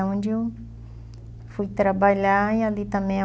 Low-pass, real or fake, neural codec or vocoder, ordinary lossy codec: none; real; none; none